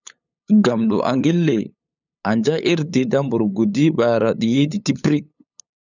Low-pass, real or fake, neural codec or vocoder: 7.2 kHz; fake; codec, 16 kHz, 8 kbps, FunCodec, trained on LibriTTS, 25 frames a second